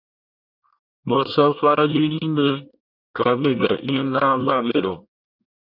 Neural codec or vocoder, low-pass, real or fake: codec, 24 kHz, 1 kbps, SNAC; 5.4 kHz; fake